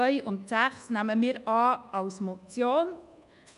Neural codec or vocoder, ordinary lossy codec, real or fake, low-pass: codec, 24 kHz, 1.2 kbps, DualCodec; none; fake; 10.8 kHz